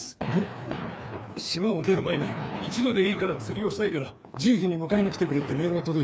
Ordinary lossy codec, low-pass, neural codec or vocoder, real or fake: none; none; codec, 16 kHz, 2 kbps, FreqCodec, larger model; fake